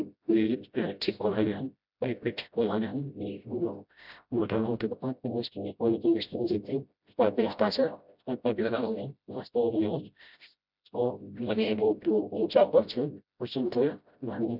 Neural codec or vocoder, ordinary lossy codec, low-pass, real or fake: codec, 16 kHz, 0.5 kbps, FreqCodec, smaller model; none; 5.4 kHz; fake